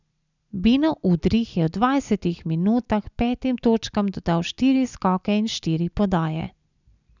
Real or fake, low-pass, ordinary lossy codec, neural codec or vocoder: real; 7.2 kHz; none; none